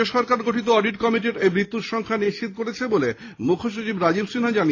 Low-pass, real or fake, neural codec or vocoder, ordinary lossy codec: 7.2 kHz; real; none; AAC, 32 kbps